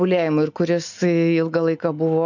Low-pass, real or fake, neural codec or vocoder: 7.2 kHz; real; none